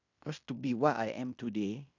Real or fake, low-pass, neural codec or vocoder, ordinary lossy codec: fake; 7.2 kHz; codec, 16 kHz in and 24 kHz out, 0.9 kbps, LongCat-Audio-Codec, fine tuned four codebook decoder; none